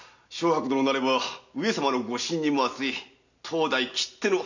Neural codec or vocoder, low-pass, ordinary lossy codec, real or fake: none; 7.2 kHz; none; real